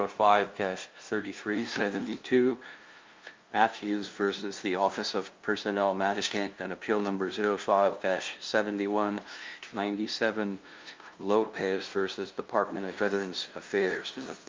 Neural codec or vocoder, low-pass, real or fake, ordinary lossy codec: codec, 16 kHz, 0.5 kbps, FunCodec, trained on LibriTTS, 25 frames a second; 7.2 kHz; fake; Opus, 24 kbps